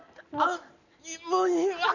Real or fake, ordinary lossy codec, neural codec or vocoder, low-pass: real; none; none; 7.2 kHz